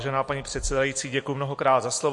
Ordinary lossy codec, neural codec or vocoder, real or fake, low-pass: MP3, 48 kbps; none; real; 10.8 kHz